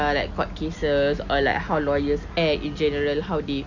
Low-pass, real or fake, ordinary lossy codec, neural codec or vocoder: 7.2 kHz; real; none; none